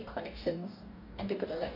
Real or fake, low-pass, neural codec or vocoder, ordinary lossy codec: fake; 5.4 kHz; codec, 44.1 kHz, 2.6 kbps, DAC; none